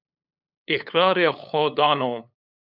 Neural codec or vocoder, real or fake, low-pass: codec, 16 kHz, 8 kbps, FunCodec, trained on LibriTTS, 25 frames a second; fake; 5.4 kHz